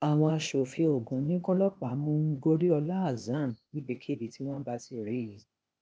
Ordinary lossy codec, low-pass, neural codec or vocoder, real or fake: none; none; codec, 16 kHz, 0.8 kbps, ZipCodec; fake